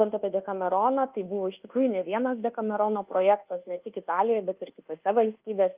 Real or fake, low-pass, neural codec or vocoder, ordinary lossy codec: fake; 3.6 kHz; codec, 24 kHz, 1.2 kbps, DualCodec; Opus, 24 kbps